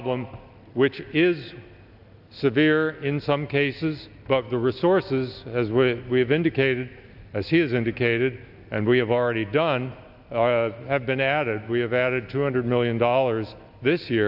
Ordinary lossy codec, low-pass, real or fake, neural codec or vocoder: AAC, 48 kbps; 5.4 kHz; real; none